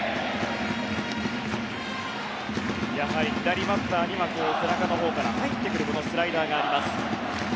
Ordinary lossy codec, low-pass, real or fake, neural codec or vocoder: none; none; real; none